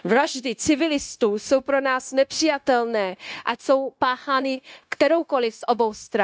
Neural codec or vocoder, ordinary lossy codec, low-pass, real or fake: codec, 16 kHz, 0.9 kbps, LongCat-Audio-Codec; none; none; fake